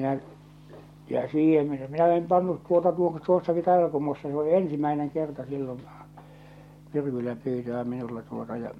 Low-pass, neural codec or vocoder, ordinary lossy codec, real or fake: 19.8 kHz; none; MP3, 64 kbps; real